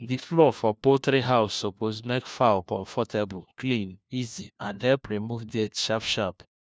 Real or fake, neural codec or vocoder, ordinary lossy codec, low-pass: fake; codec, 16 kHz, 1 kbps, FunCodec, trained on LibriTTS, 50 frames a second; none; none